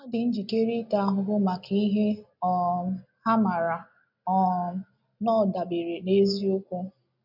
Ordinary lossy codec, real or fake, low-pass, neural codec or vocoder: none; real; 5.4 kHz; none